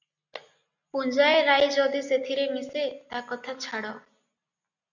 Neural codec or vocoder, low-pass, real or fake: none; 7.2 kHz; real